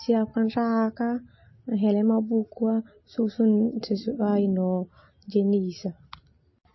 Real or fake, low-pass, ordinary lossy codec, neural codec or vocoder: real; 7.2 kHz; MP3, 24 kbps; none